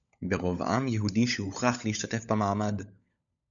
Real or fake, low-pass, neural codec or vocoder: fake; 7.2 kHz; codec, 16 kHz, 16 kbps, FunCodec, trained on LibriTTS, 50 frames a second